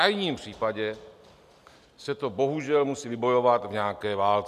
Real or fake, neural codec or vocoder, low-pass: real; none; 14.4 kHz